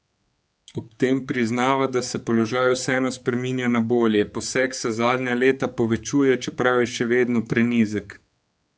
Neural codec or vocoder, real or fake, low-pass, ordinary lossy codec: codec, 16 kHz, 4 kbps, X-Codec, HuBERT features, trained on general audio; fake; none; none